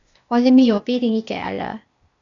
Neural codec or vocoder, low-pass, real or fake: codec, 16 kHz, 0.8 kbps, ZipCodec; 7.2 kHz; fake